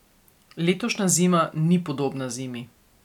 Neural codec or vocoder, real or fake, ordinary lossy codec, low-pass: none; real; none; 19.8 kHz